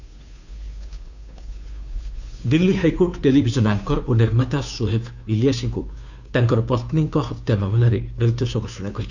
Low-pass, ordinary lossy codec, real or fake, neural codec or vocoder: 7.2 kHz; none; fake; codec, 16 kHz, 2 kbps, FunCodec, trained on Chinese and English, 25 frames a second